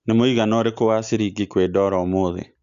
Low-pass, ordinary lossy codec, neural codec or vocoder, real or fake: 7.2 kHz; Opus, 64 kbps; none; real